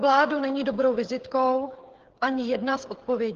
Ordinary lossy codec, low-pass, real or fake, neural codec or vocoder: Opus, 16 kbps; 7.2 kHz; fake; codec, 16 kHz, 8 kbps, FreqCodec, smaller model